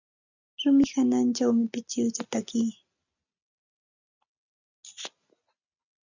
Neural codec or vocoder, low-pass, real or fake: none; 7.2 kHz; real